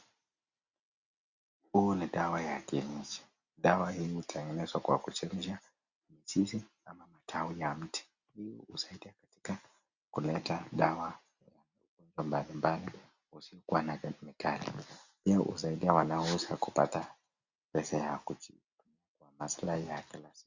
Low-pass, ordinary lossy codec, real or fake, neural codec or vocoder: 7.2 kHz; Opus, 64 kbps; real; none